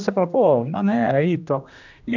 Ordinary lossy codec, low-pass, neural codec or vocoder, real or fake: none; 7.2 kHz; codec, 16 kHz, 1 kbps, X-Codec, HuBERT features, trained on general audio; fake